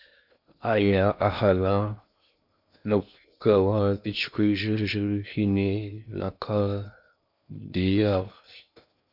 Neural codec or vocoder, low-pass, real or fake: codec, 16 kHz in and 24 kHz out, 0.6 kbps, FocalCodec, streaming, 2048 codes; 5.4 kHz; fake